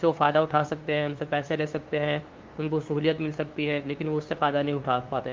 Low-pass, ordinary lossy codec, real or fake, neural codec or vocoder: 7.2 kHz; Opus, 32 kbps; fake; codec, 16 kHz, 2 kbps, FunCodec, trained on LibriTTS, 25 frames a second